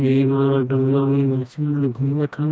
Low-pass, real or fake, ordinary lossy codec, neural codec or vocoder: none; fake; none; codec, 16 kHz, 1 kbps, FreqCodec, smaller model